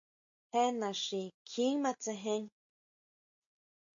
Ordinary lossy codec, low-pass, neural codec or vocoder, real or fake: AAC, 64 kbps; 7.2 kHz; none; real